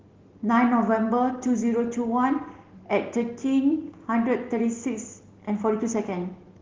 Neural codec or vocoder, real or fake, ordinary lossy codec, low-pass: none; real; Opus, 16 kbps; 7.2 kHz